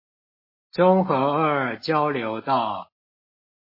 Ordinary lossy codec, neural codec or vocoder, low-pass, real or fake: MP3, 24 kbps; none; 5.4 kHz; real